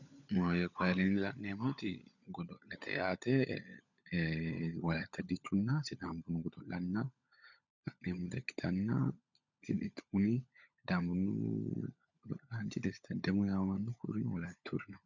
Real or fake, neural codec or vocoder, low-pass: fake; codec, 16 kHz, 16 kbps, FunCodec, trained on LibriTTS, 50 frames a second; 7.2 kHz